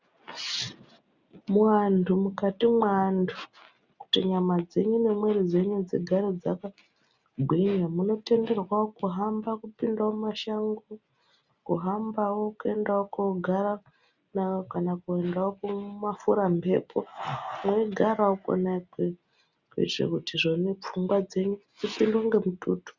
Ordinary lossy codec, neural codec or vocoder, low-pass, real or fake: Opus, 64 kbps; none; 7.2 kHz; real